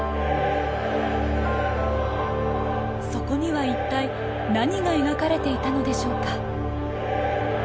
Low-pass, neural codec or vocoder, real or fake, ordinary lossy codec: none; none; real; none